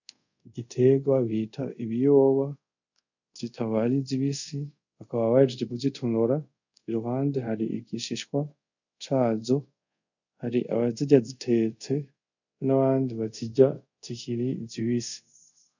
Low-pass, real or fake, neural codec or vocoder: 7.2 kHz; fake; codec, 24 kHz, 0.5 kbps, DualCodec